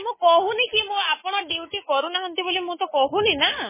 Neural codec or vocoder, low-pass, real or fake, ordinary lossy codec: codec, 24 kHz, 3.1 kbps, DualCodec; 3.6 kHz; fake; MP3, 16 kbps